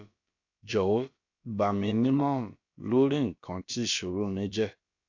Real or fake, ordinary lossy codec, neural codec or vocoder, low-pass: fake; AAC, 48 kbps; codec, 16 kHz, about 1 kbps, DyCAST, with the encoder's durations; 7.2 kHz